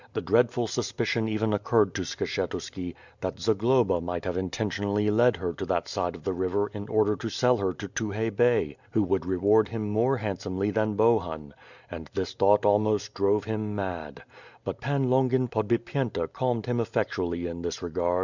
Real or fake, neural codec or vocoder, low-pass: real; none; 7.2 kHz